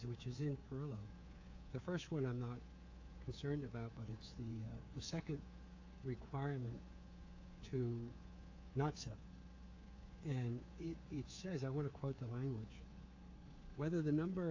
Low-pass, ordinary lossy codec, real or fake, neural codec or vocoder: 7.2 kHz; AAC, 48 kbps; fake; codec, 24 kHz, 3.1 kbps, DualCodec